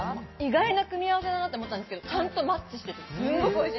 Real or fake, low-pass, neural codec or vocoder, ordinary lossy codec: real; 7.2 kHz; none; MP3, 24 kbps